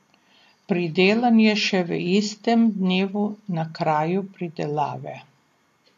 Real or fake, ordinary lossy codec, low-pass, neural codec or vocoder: real; AAC, 64 kbps; 14.4 kHz; none